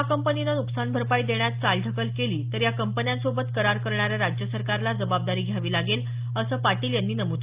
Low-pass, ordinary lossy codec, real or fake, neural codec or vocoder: 3.6 kHz; Opus, 32 kbps; real; none